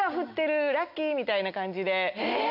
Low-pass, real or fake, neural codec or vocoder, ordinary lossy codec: 5.4 kHz; real; none; none